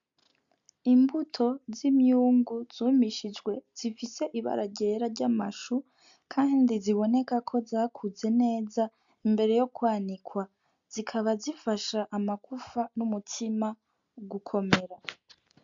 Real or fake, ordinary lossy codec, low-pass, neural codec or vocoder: real; MP3, 64 kbps; 7.2 kHz; none